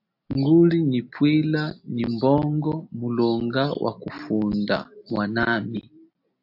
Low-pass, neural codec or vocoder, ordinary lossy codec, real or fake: 5.4 kHz; none; AAC, 48 kbps; real